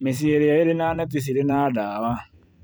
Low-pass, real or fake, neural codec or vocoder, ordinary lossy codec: none; fake; vocoder, 44.1 kHz, 128 mel bands every 256 samples, BigVGAN v2; none